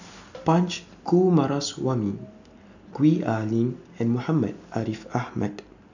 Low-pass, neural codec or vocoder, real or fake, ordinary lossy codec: 7.2 kHz; none; real; none